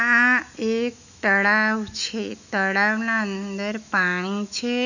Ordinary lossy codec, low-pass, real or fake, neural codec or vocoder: none; 7.2 kHz; fake; autoencoder, 48 kHz, 128 numbers a frame, DAC-VAE, trained on Japanese speech